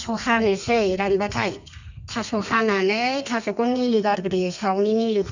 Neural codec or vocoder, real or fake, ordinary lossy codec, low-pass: codec, 32 kHz, 1.9 kbps, SNAC; fake; none; 7.2 kHz